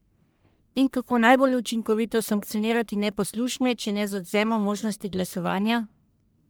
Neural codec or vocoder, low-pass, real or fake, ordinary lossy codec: codec, 44.1 kHz, 1.7 kbps, Pupu-Codec; none; fake; none